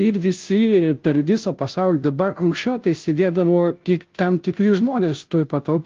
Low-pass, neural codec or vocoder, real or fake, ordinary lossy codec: 7.2 kHz; codec, 16 kHz, 0.5 kbps, FunCodec, trained on Chinese and English, 25 frames a second; fake; Opus, 32 kbps